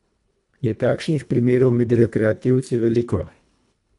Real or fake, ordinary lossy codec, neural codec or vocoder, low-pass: fake; none; codec, 24 kHz, 1.5 kbps, HILCodec; 10.8 kHz